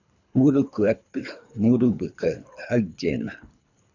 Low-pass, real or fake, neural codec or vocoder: 7.2 kHz; fake; codec, 24 kHz, 3 kbps, HILCodec